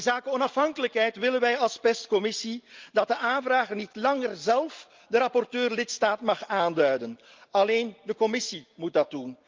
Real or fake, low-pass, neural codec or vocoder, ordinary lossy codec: real; 7.2 kHz; none; Opus, 32 kbps